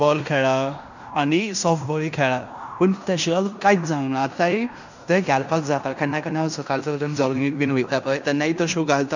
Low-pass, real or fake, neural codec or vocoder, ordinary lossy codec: 7.2 kHz; fake; codec, 16 kHz in and 24 kHz out, 0.9 kbps, LongCat-Audio-Codec, fine tuned four codebook decoder; none